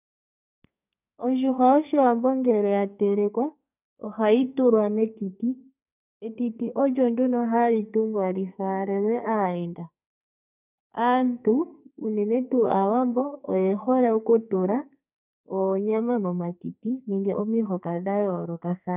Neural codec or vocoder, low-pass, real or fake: codec, 44.1 kHz, 2.6 kbps, SNAC; 3.6 kHz; fake